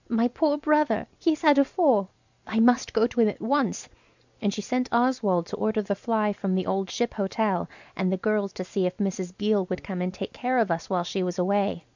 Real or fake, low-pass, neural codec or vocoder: real; 7.2 kHz; none